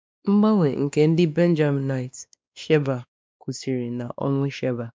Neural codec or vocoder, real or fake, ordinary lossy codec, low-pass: codec, 16 kHz, 2 kbps, X-Codec, WavLM features, trained on Multilingual LibriSpeech; fake; none; none